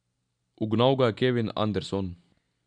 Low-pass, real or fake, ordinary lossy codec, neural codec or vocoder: 9.9 kHz; real; none; none